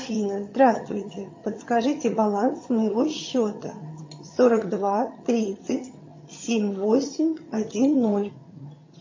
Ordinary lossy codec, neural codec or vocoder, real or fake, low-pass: MP3, 32 kbps; vocoder, 22.05 kHz, 80 mel bands, HiFi-GAN; fake; 7.2 kHz